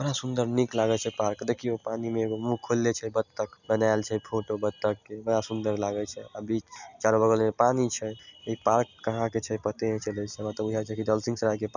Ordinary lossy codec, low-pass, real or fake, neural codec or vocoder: none; 7.2 kHz; real; none